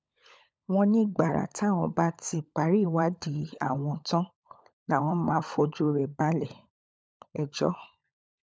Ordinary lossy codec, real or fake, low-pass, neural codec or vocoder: none; fake; none; codec, 16 kHz, 16 kbps, FunCodec, trained on LibriTTS, 50 frames a second